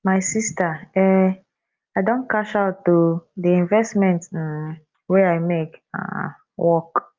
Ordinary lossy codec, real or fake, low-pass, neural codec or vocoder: Opus, 32 kbps; real; 7.2 kHz; none